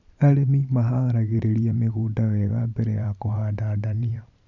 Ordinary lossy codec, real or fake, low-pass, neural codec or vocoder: none; fake; 7.2 kHz; vocoder, 44.1 kHz, 128 mel bands every 512 samples, BigVGAN v2